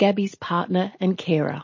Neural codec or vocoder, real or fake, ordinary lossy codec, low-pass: none; real; MP3, 32 kbps; 7.2 kHz